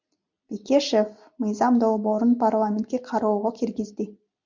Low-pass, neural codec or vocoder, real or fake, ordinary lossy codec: 7.2 kHz; none; real; MP3, 64 kbps